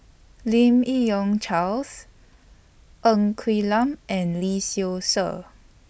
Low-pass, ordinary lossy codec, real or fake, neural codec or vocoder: none; none; real; none